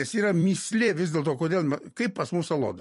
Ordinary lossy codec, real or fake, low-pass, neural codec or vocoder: MP3, 48 kbps; fake; 14.4 kHz; vocoder, 44.1 kHz, 128 mel bands every 256 samples, BigVGAN v2